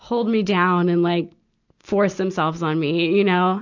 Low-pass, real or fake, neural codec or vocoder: 7.2 kHz; real; none